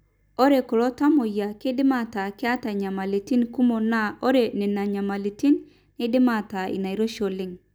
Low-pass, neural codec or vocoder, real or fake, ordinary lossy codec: none; none; real; none